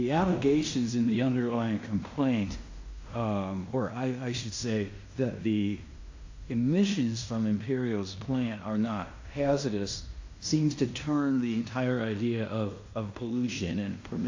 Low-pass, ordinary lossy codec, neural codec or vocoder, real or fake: 7.2 kHz; AAC, 48 kbps; codec, 16 kHz in and 24 kHz out, 0.9 kbps, LongCat-Audio-Codec, fine tuned four codebook decoder; fake